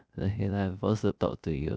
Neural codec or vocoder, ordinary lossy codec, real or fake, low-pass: codec, 16 kHz, 0.3 kbps, FocalCodec; none; fake; none